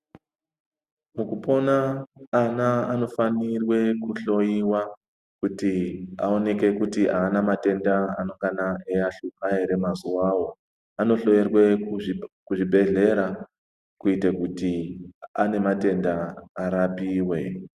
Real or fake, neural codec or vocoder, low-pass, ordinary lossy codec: real; none; 14.4 kHz; Opus, 64 kbps